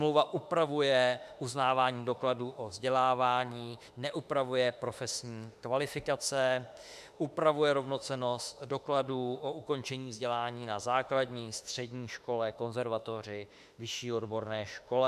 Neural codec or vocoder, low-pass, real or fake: autoencoder, 48 kHz, 32 numbers a frame, DAC-VAE, trained on Japanese speech; 14.4 kHz; fake